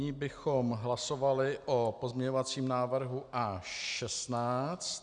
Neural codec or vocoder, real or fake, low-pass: none; real; 9.9 kHz